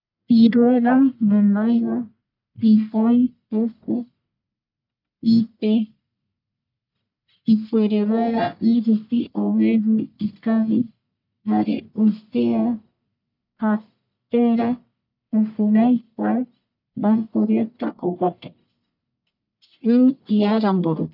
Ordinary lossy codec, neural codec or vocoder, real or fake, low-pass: none; codec, 44.1 kHz, 1.7 kbps, Pupu-Codec; fake; 5.4 kHz